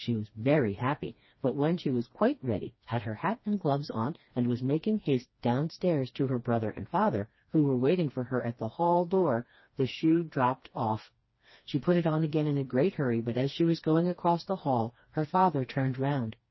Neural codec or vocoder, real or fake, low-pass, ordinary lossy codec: codec, 16 kHz, 2 kbps, FreqCodec, smaller model; fake; 7.2 kHz; MP3, 24 kbps